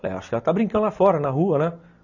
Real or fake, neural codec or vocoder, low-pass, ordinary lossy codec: real; none; 7.2 kHz; none